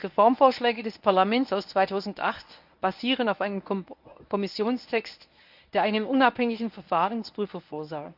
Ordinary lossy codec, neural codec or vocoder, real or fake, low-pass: none; codec, 24 kHz, 0.9 kbps, WavTokenizer, medium speech release version 1; fake; 5.4 kHz